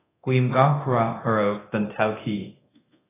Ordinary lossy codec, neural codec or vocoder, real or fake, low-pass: AAC, 16 kbps; codec, 24 kHz, 0.9 kbps, DualCodec; fake; 3.6 kHz